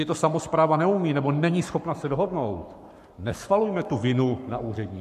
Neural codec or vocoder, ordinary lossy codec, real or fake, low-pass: codec, 44.1 kHz, 7.8 kbps, Pupu-Codec; MP3, 96 kbps; fake; 14.4 kHz